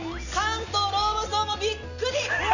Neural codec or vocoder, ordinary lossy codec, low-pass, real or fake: none; none; 7.2 kHz; real